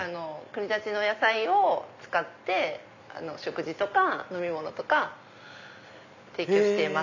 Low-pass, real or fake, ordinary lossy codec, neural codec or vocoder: 7.2 kHz; real; none; none